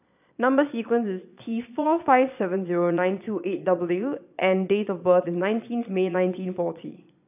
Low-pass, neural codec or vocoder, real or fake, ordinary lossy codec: 3.6 kHz; vocoder, 22.05 kHz, 80 mel bands, Vocos; fake; none